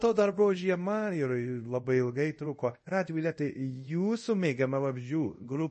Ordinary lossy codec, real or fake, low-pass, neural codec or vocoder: MP3, 32 kbps; fake; 10.8 kHz; codec, 24 kHz, 0.5 kbps, DualCodec